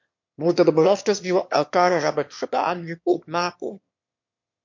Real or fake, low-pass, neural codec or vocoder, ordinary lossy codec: fake; 7.2 kHz; autoencoder, 22.05 kHz, a latent of 192 numbers a frame, VITS, trained on one speaker; MP3, 48 kbps